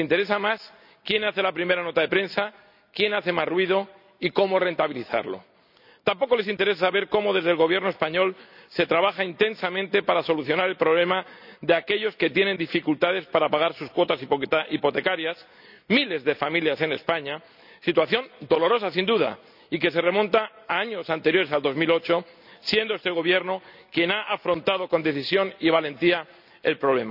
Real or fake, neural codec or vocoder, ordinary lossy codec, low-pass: real; none; none; 5.4 kHz